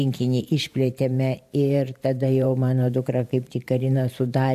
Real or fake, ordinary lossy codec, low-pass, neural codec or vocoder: fake; MP3, 96 kbps; 14.4 kHz; vocoder, 44.1 kHz, 128 mel bands every 512 samples, BigVGAN v2